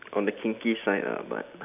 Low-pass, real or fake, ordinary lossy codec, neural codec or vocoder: 3.6 kHz; real; none; none